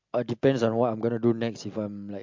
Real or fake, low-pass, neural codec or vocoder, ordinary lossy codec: real; 7.2 kHz; none; MP3, 64 kbps